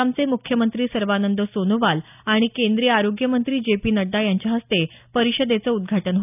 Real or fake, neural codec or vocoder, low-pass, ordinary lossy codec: real; none; 3.6 kHz; none